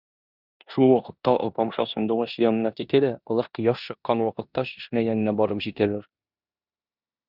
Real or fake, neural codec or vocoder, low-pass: fake; codec, 16 kHz in and 24 kHz out, 0.9 kbps, LongCat-Audio-Codec, four codebook decoder; 5.4 kHz